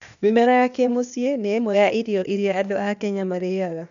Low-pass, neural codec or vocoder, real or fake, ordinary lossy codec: 7.2 kHz; codec, 16 kHz, 0.8 kbps, ZipCodec; fake; none